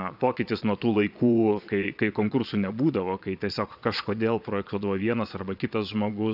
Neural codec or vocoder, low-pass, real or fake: vocoder, 22.05 kHz, 80 mel bands, Vocos; 5.4 kHz; fake